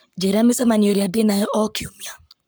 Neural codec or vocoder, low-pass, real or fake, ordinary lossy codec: codec, 44.1 kHz, 7.8 kbps, Pupu-Codec; none; fake; none